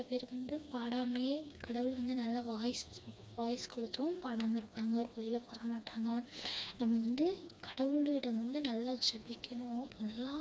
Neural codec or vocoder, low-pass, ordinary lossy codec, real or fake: codec, 16 kHz, 2 kbps, FreqCodec, smaller model; none; none; fake